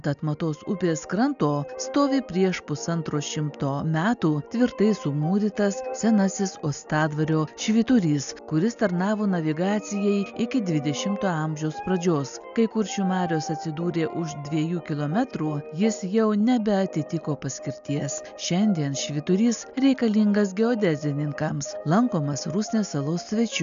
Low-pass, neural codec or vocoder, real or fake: 7.2 kHz; none; real